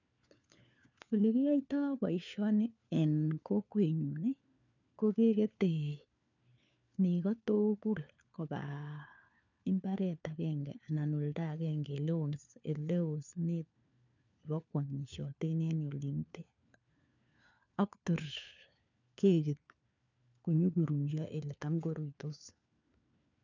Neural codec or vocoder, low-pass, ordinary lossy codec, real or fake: codec, 16 kHz, 4 kbps, FunCodec, trained on LibriTTS, 50 frames a second; 7.2 kHz; none; fake